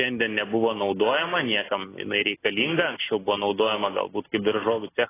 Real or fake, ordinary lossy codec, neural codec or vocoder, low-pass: real; AAC, 16 kbps; none; 3.6 kHz